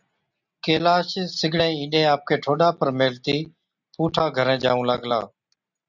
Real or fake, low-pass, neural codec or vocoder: real; 7.2 kHz; none